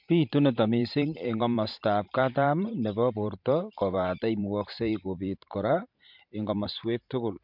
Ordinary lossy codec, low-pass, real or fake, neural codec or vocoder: MP3, 48 kbps; 5.4 kHz; fake; vocoder, 44.1 kHz, 128 mel bands every 512 samples, BigVGAN v2